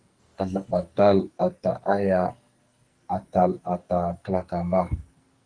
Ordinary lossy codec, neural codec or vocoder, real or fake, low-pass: Opus, 32 kbps; codec, 44.1 kHz, 2.6 kbps, SNAC; fake; 9.9 kHz